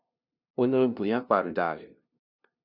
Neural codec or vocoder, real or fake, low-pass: codec, 16 kHz, 0.5 kbps, FunCodec, trained on LibriTTS, 25 frames a second; fake; 5.4 kHz